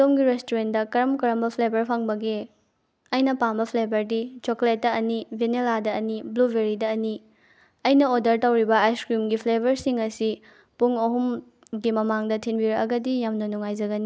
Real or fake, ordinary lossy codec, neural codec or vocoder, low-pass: real; none; none; none